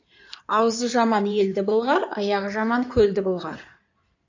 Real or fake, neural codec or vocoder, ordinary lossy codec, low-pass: fake; codec, 16 kHz in and 24 kHz out, 2.2 kbps, FireRedTTS-2 codec; AAC, 48 kbps; 7.2 kHz